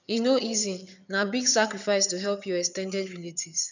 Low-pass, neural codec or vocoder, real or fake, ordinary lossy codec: 7.2 kHz; vocoder, 22.05 kHz, 80 mel bands, HiFi-GAN; fake; none